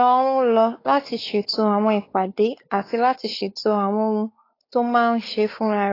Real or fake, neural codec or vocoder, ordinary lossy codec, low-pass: fake; codec, 16 kHz, 4 kbps, X-Codec, WavLM features, trained on Multilingual LibriSpeech; AAC, 24 kbps; 5.4 kHz